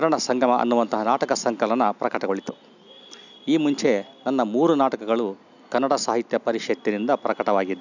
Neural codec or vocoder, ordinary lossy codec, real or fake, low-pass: none; none; real; 7.2 kHz